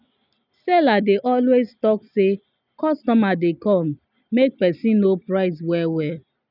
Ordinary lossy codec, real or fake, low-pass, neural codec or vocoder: none; real; 5.4 kHz; none